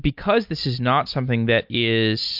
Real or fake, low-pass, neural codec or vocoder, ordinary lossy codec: real; 5.4 kHz; none; MP3, 48 kbps